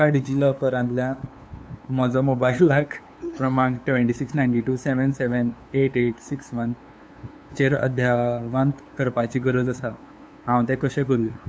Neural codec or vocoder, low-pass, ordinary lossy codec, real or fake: codec, 16 kHz, 2 kbps, FunCodec, trained on LibriTTS, 25 frames a second; none; none; fake